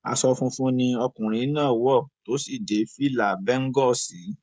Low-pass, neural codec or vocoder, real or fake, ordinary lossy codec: none; codec, 16 kHz, 16 kbps, FreqCodec, smaller model; fake; none